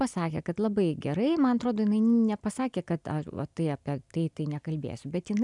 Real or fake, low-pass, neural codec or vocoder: real; 10.8 kHz; none